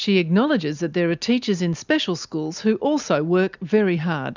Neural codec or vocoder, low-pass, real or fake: none; 7.2 kHz; real